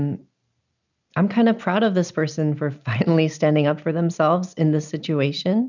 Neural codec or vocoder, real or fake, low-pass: none; real; 7.2 kHz